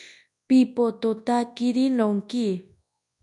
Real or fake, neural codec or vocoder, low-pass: fake; codec, 24 kHz, 0.9 kbps, WavTokenizer, large speech release; 10.8 kHz